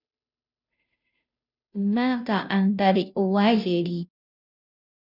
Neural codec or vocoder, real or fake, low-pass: codec, 16 kHz, 0.5 kbps, FunCodec, trained on Chinese and English, 25 frames a second; fake; 5.4 kHz